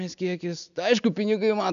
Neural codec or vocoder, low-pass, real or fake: none; 7.2 kHz; real